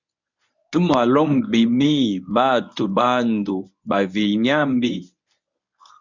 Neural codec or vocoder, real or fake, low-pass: codec, 24 kHz, 0.9 kbps, WavTokenizer, medium speech release version 1; fake; 7.2 kHz